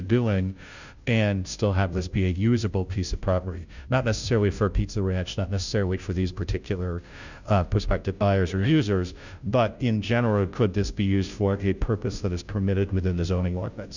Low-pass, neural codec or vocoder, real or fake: 7.2 kHz; codec, 16 kHz, 0.5 kbps, FunCodec, trained on Chinese and English, 25 frames a second; fake